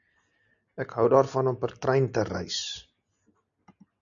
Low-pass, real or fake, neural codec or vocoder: 7.2 kHz; real; none